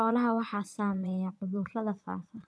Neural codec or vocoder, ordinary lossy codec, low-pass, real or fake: vocoder, 22.05 kHz, 80 mel bands, Vocos; none; none; fake